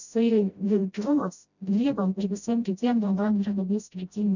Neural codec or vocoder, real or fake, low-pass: codec, 16 kHz, 0.5 kbps, FreqCodec, smaller model; fake; 7.2 kHz